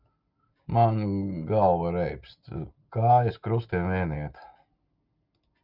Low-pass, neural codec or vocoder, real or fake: 5.4 kHz; none; real